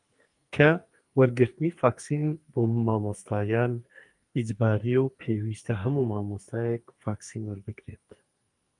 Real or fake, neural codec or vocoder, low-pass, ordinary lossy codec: fake; codec, 44.1 kHz, 2.6 kbps, SNAC; 10.8 kHz; Opus, 32 kbps